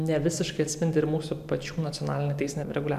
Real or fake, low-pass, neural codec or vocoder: fake; 14.4 kHz; vocoder, 44.1 kHz, 128 mel bands every 512 samples, BigVGAN v2